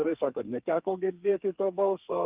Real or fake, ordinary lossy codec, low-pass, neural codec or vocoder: fake; Opus, 32 kbps; 3.6 kHz; codec, 32 kHz, 1.9 kbps, SNAC